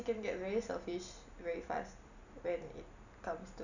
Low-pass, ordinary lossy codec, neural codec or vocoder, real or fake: 7.2 kHz; none; none; real